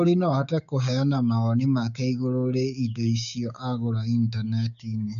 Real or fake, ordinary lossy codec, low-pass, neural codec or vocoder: fake; none; 7.2 kHz; codec, 16 kHz, 6 kbps, DAC